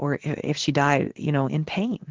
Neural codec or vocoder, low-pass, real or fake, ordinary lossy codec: codec, 16 kHz in and 24 kHz out, 1 kbps, XY-Tokenizer; 7.2 kHz; fake; Opus, 16 kbps